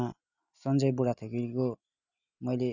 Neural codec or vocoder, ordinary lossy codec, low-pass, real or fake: none; none; 7.2 kHz; real